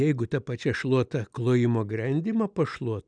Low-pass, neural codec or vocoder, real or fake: 9.9 kHz; none; real